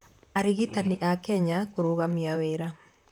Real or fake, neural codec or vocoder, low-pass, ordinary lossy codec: fake; vocoder, 44.1 kHz, 128 mel bands, Pupu-Vocoder; 19.8 kHz; none